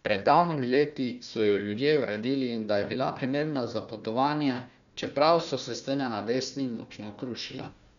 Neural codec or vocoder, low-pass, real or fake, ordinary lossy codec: codec, 16 kHz, 1 kbps, FunCodec, trained on Chinese and English, 50 frames a second; 7.2 kHz; fake; none